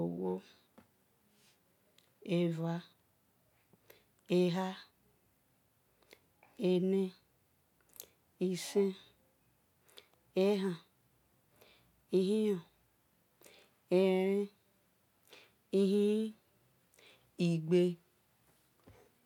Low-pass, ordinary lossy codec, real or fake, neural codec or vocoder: 19.8 kHz; none; real; none